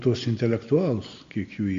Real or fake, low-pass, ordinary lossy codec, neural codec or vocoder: real; 7.2 kHz; MP3, 48 kbps; none